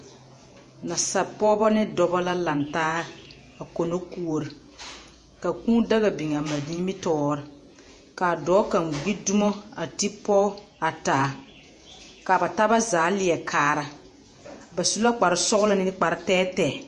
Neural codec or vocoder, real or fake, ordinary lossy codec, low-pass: vocoder, 48 kHz, 128 mel bands, Vocos; fake; MP3, 48 kbps; 14.4 kHz